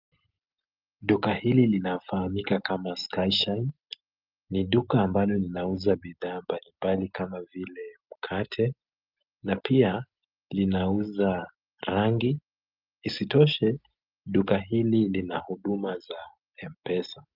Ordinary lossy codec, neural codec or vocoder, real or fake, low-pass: Opus, 24 kbps; none; real; 5.4 kHz